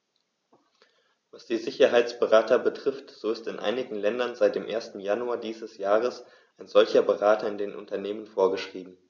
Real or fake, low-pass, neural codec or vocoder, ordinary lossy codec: real; none; none; none